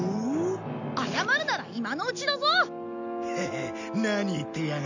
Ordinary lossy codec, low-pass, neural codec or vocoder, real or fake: none; 7.2 kHz; none; real